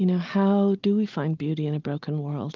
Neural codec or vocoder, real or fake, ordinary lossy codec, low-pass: none; real; Opus, 32 kbps; 7.2 kHz